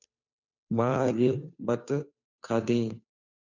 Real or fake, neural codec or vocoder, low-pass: fake; codec, 16 kHz, 2 kbps, FunCodec, trained on Chinese and English, 25 frames a second; 7.2 kHz